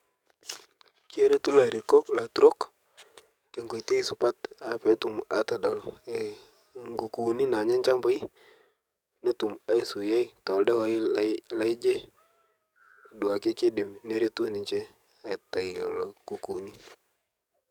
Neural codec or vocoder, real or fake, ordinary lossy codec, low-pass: codec, 44.1 kHz, 7.8 kbps, DAC; fake; none; 19.8 kHz